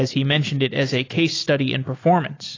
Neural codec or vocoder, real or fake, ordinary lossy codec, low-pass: none; real; AAC, 32 kbps; 7.2 kHz